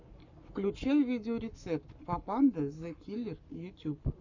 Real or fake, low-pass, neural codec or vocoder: fake; 7.2 kHz; codec, 44.1 kHz, 7.8 kbps, Pupu-Codec